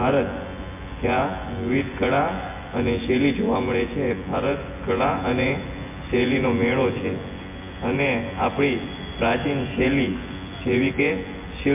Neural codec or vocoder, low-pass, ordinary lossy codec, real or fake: vocoder, 24 kHz, 100 mel bands, Vocos; 3.6 kHz; MP3, 24 kbps; fake